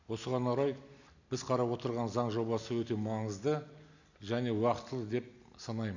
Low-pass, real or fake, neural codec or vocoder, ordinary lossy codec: 7.2 kHz; real; none; none